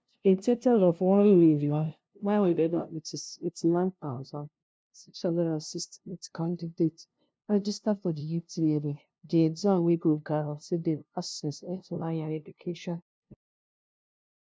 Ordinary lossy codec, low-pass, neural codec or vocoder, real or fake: none; none; codec, 16 kHz, 0.5 kbps, FunCodec, trained on LibriTTS, 25 frames a second; fake